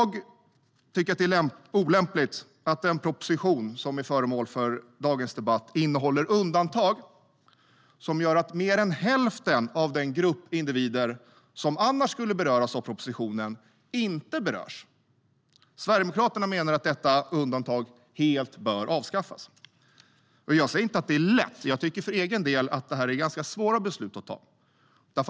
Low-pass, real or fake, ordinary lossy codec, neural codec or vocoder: none; real; none; none